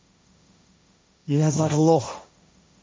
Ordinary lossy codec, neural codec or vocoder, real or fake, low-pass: none; codec, 16 kHz, 1.1 kbps, Voila-Tokenizer; fake; none